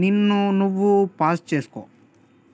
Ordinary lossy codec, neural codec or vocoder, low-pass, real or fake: none; none; none; real